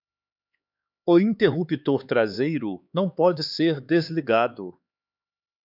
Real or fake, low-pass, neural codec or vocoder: fake; 5.4 kHz; codec, 16 kHz, 4 kbps, X-Codec, HuBERT features, trained on LibriSpeech